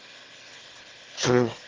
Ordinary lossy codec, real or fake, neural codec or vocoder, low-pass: Opus, 16 kbps; fake; autoencoder, 22.05 kHz, a latent of 192 numbers a frame, VITS, trained on one speaker; 7.2 kHz